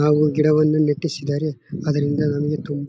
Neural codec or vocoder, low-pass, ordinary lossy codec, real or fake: none; none; none; real